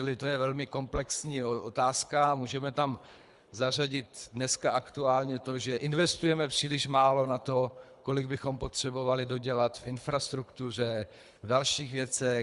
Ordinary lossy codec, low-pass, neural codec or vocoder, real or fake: Opus, 64 kbps; 10.8 kHz; codec, 24 kHz, 3 kbps, HILCodec; fake